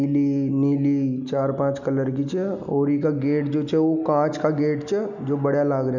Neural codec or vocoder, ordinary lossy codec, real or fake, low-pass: none; none; real; 7.2 kHz